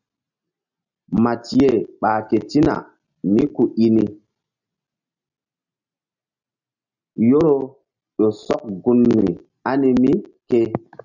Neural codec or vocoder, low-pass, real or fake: none; 7.2 kHz; real